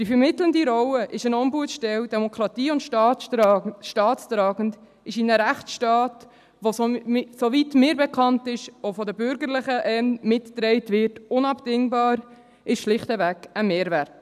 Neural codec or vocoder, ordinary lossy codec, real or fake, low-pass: none; none; real; 14.4 kHz